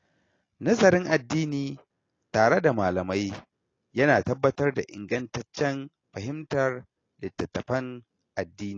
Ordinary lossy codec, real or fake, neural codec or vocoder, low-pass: AAC, 32 kbps; real; none; 7.2 kHz